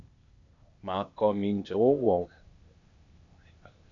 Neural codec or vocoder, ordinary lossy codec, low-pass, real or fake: codec, 16 kHz, 0.8 kbps, ZipCodec; MP3, 96 kbps; 7.2 kHz; fake